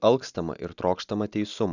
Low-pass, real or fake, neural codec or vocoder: 7.2 kHz; real; none